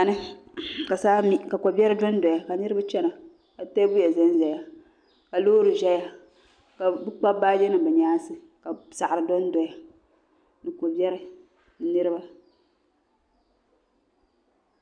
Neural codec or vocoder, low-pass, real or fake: none; 9.9 kHz; real